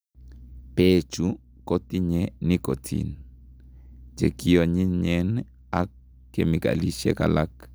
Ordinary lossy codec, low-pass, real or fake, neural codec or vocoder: none; none; real; none